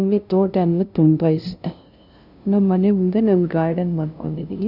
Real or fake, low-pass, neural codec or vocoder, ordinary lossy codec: fake; 5.4 kHz; codec, 16 kHz, 0.5 kbps, FunCodec, trained on LibriTTS, 25 frames a second; none